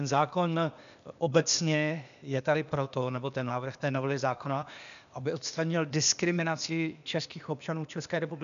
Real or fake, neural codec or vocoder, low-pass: fake; codec, 16 kHz, 0.8 kbps, ZipCodec; 7.2 kHz